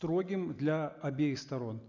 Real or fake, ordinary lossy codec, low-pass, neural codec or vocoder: real; none; 7.2 kHz; none